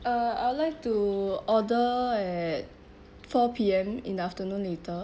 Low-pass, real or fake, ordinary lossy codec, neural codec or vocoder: none; real; none; none